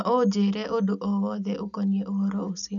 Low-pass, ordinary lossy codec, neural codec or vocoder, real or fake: 7.2 kHz; none; none; real